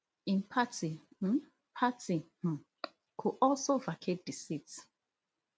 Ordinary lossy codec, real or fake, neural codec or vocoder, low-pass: none; real; none; none